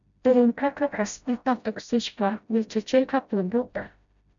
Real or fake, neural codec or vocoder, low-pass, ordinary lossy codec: fake; codec, 16 kHz, 0.5 kbps, FreqCodec, smaller model; 7.2 kHz; MP3, 96 kbps